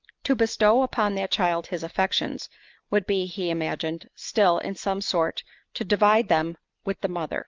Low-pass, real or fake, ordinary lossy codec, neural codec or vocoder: 7.2 kHz; real; Opus, 24 kbps; none